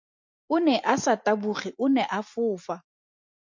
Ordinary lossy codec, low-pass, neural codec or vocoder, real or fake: MP3, 48 kbps; 7.2 kHz; none; real